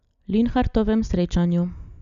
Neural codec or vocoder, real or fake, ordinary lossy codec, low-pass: none; real; none; 7.2 kHz